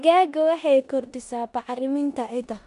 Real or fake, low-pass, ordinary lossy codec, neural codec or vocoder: fake; 10.8 kHz; none; codec, 16 kHz in and 24 kHz out, 0.9 kbps, LongCat-Audio-Codec, four codebook decoder